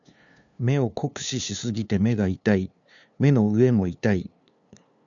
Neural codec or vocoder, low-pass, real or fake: codec, 16 kHz, 2 kbps, FunCodec, trained on LibriTTS, 25 frames a second; 7.2 kHz; fake